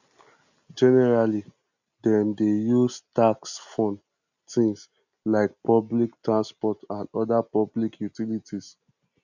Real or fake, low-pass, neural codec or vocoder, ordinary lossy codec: real; 7.2 kHz; none; none